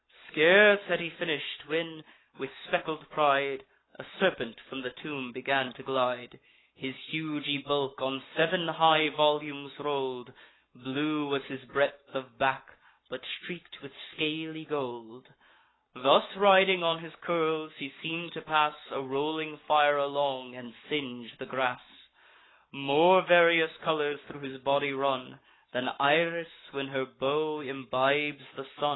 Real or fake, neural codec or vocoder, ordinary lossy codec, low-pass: fake; codec, 44.1 kHz, 7.8 kbps, Pupu-Codec; AAC, 16 kbps; 7.2 kHz